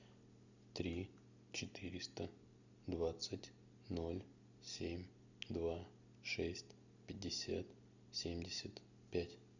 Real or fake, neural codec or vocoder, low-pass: real; none; 7.2 kHz